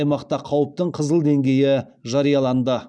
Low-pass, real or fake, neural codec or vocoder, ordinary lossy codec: 9.9 kHz; real; none; none